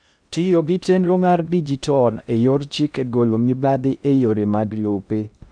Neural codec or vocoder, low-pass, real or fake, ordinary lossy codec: codec, 16 kHz in and 24 kHz out, 0.6 kbps, FocalCodec, streaming, 2048 codes; 9.9 kHz; fake; none